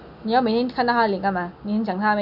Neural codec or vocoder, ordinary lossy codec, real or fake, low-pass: none; none; real; 5.4 kHz